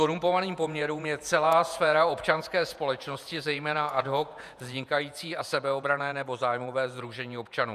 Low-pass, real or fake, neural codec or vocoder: 14.4 kHz; fake; vocoder, 48 kHz, 128 mel bands, Vocos